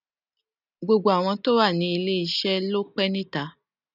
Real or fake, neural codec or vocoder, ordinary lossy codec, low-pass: real; none; none; 5.4 kHz